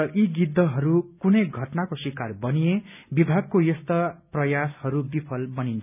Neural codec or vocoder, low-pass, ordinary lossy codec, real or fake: none; 3.6 kHz; none; real